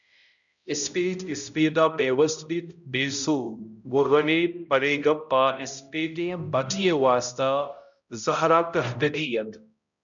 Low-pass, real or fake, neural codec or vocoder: 7.2 kHz; fake; codec, 16 kHz, 0.5 kbps, X-Codec, HuBERT features, trained on balanced general audio